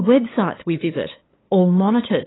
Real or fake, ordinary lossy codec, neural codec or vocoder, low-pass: fake; AAC, 16 kbps; codec, 16 kHz, 8 kbps, FunCodec, trained on LibriTTS, 25 frames a second; 7.2 kHz